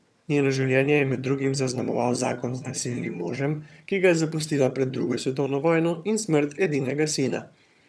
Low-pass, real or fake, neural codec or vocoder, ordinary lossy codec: none; fake; vocoder, 22.05 kHz, 80 mel bands, HiFi-GAN; none